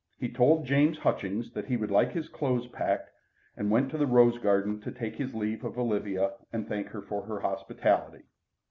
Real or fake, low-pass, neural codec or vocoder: real; 7.2 kHz; none